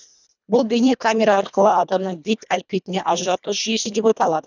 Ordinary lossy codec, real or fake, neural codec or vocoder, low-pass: none; fake; codec, 24 kHz, 1.5 kbps, HILCodec; 7.2 kHz